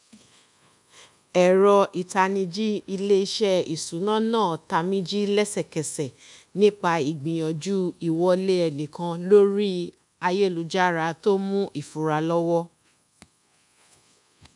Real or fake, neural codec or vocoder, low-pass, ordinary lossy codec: fake; codec, 24 kHz, 1.2 kbps, DualCodec; 10.8 kHz; none